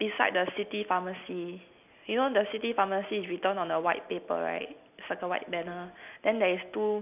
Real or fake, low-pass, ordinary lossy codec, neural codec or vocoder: real; 3.6 kHz; none; none